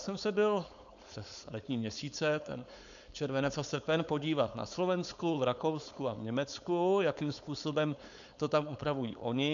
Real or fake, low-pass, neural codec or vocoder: fake; 7.2 kHz; codec, 16 kHz, 4.8 kbps, FACodec